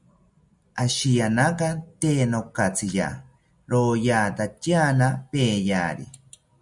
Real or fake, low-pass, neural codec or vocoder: real; 10.8 kHz; none